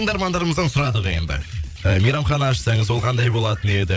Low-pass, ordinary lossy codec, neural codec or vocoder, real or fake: none; none; codec, 16 kHz, 8 kbps, FreqCodec, larger model; fake